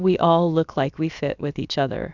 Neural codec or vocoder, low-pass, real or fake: codec, 16 kHz, about 1 kbps, DyCAST, with the encoder's durations; 7.2 kHz; fake